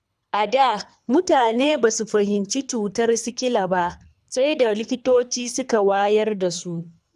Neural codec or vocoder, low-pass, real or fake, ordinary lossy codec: codec, 24 kHz, 3 kbps, HILCodec; none; fake; none